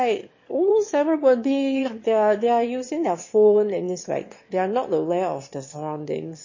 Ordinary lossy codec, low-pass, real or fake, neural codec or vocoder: MP3, 32 kbps; 7.2 kHz; fake; autoencoder, 22.05 kHz, a latent of 192 numbers a frame, VITS, trained on one speaker